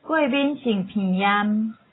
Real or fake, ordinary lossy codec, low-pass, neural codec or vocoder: real; AAC, 16 kbps; 7.2 kHz; none